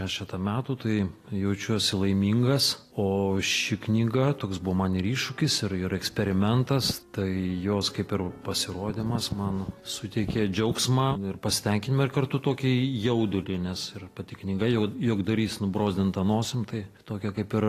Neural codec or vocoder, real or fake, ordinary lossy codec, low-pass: none; real; AAC, 48 kbps; 14.4 kHz